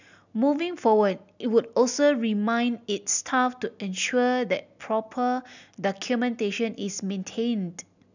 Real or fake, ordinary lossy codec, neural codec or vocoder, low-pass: real; none; none; 7.2 kHz